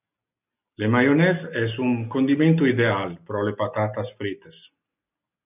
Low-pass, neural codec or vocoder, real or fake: 3.6 kHz; none; real